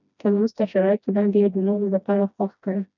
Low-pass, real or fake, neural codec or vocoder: 7.2 kHz; fake; codec, 16 kHz, 1 kbps, FreqCodec, smaller model